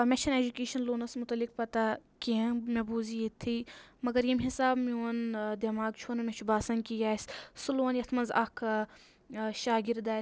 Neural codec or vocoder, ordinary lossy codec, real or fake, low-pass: none; none; real; none